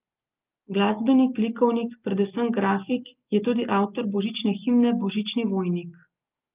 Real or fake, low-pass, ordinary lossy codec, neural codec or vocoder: real; 3.6 kHz; Opus, 24 kbps; none